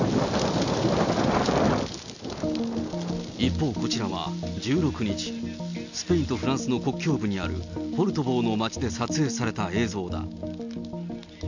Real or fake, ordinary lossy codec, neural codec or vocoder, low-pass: real; none; none; 7.2 kHz